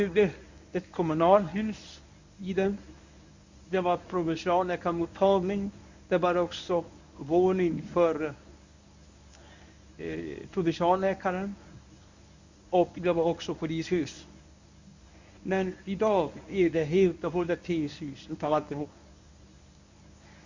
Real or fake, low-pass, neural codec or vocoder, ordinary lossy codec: fake; 7.2 kHz; codec, 24 kHz, 0.9 kbps, WavTokenizer, medium speech release version 1; Opus, 64 kbps